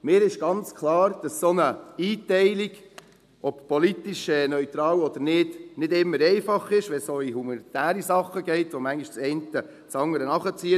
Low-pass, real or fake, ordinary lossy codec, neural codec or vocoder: 14.4 kHz; real; none; none